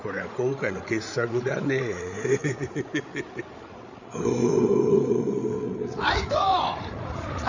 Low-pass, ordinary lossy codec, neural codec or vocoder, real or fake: 7.2 kHz; none; codec, 16 kHz, 8 kbps, FreqCodec, larger model; fake